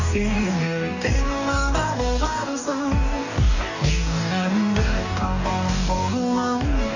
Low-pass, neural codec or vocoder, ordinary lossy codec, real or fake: 7.2 kHz; codec, 44.1 kHz, 2.6 kbps, DAC; none; fake